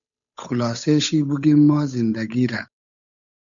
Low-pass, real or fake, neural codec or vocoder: 7.2 kHz; fake; codec, 16 kHz, 8 kbps, FunCodec, trained on Chinese and English, 25 frames a second